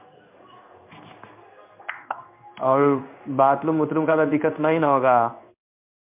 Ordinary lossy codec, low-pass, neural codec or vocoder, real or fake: MP3, 32 kbps; 3.6 kHz; codec, 16 kHz in and 24 kHz out, 1 kbps, XY-Tokenizer; fake